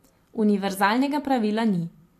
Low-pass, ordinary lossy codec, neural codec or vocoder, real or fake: 14.4 kHz; AAC, 64 kbps; vocoder, 44.1 kHz, 128 mel bands every 256 samples, BigVGAN v2; fake